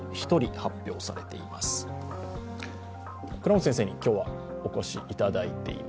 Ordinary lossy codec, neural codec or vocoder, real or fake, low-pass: none; none; real; none